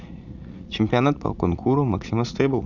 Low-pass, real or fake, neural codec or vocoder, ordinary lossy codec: 7.2 kHz; fake; vocoder, 44.1 kHz, 80 mel bands, Vocos; none